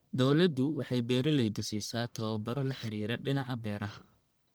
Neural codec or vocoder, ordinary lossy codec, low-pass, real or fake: codec, 44.1 kHz, 1.7 kbps, Pupu-Codec; none; none; fake